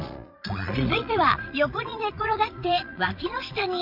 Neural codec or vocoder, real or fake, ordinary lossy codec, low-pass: vocoder, 22.05 kHz, 80 mel bands, Vocos; fake; AAC, 48 kbps; 5.4 kHz